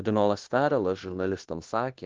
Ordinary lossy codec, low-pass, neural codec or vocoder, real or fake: Opus, 16 kbps; 7.2 kHz; codec, 16 kHz, 0.9 kbps, LongCat-Audio-Codec; fake